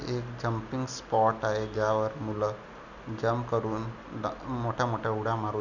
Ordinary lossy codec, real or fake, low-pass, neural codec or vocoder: none; real; 7.2 kHz; none